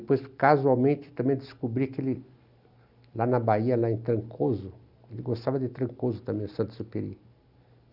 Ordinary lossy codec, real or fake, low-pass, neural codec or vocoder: none; real; 5.4 kHz; none